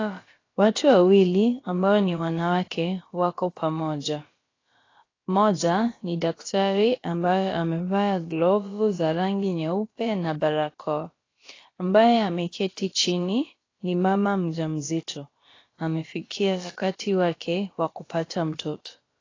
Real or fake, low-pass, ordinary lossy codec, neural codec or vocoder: fake; 7.2 kHz; AAC, 32 kbps; codec, 16 kHz, about 1 kbps, DyCAST, with the encoder's durations